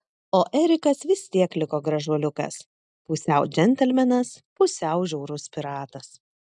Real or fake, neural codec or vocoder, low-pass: real; none; 10.8 kHz